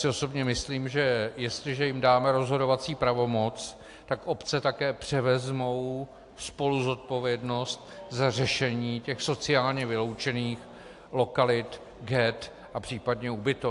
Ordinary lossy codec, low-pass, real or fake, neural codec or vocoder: AAC, 64 kbps; 10.8 kHz; real; none